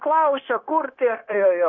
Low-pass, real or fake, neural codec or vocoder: 7.2 kHz; fake; codec, 16 kHz, 0.9 kbps, LongCat-Audio-Codec